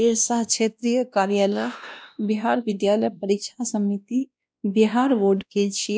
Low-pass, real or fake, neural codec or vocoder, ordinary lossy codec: none; fake; codec, 16 kHz, 1 kbps, X-Codec, WavLM features, trained on Multilingual LibriSpeech; none